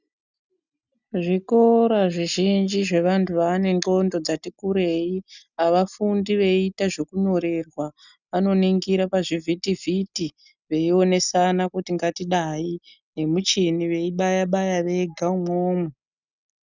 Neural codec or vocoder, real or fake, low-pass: none; real; 7.2 kHz